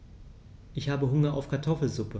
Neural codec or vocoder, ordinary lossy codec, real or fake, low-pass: none; none; real; none